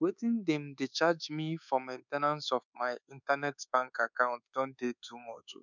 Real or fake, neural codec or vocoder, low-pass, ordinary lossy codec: fake; codec, 24 kHz, 1.2 kbps, DualCodec; 7.2 kHz; none